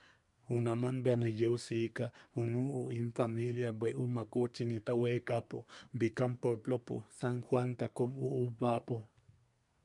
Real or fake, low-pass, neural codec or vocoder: fake; 10.8 kHz; codec, 24 kHz, 1 kbps, SNAC